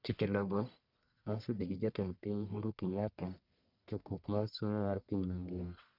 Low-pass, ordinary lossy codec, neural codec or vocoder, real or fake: 5.4 kHz; none; codec, 44.1 kHz, 1.7 kbps, Pupu-Codec; fake